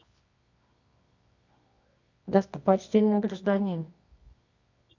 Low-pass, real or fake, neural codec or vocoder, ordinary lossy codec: 7.2 kHz; fake; codec, 24 kHz, 0.9 kbps, WavTokenizer, medium music audio release; none